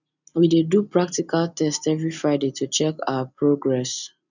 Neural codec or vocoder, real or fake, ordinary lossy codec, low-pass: none; real; none; 7.2 kHz